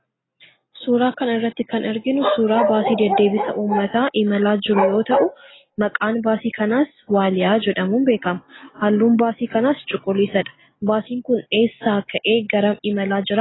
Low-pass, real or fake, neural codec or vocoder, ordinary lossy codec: 7.2 kHz; real; none; AAC, 16 kbps